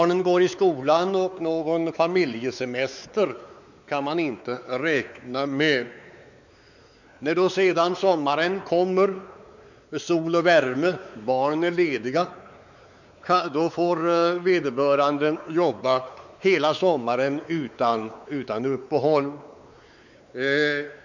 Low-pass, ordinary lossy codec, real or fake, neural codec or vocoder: 7.2 kHz; none; fake; codec, 16 kHz, 4 kbps, X-Codec, WavLM features, trained on Multilingual LibriSpeech